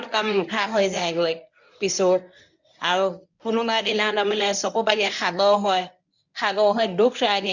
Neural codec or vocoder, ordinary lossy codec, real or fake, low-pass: codec, 24 kHz, 0.9 kbps, WavTokenizer, medium speech release version 1; none; fake; 7.2 kHz